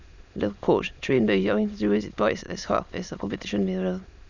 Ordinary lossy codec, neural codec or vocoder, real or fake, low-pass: none; autoencoder, 22.05 kHz, a latent of 192 numbers a frame, VITS, trained on many speakers; fake; 7.2 kHz